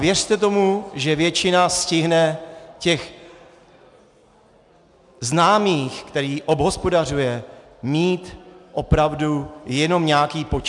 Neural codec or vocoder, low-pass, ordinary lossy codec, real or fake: none; 10.8 kHz; MP3, 96 kbps; real